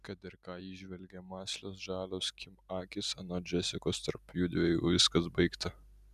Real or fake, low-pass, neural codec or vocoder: real; 14.4 kHz; none